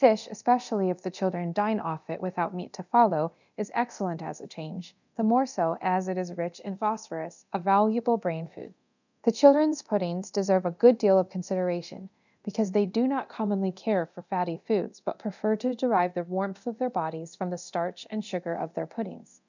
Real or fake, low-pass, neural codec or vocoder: fake; 7.2 kHz; codec, 24 kHz, 0.9 kbps, DualCodec